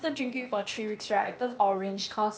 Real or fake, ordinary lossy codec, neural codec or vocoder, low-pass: fake; none; codec, 16 kHz, 0.8 kbps, ZipCodec; none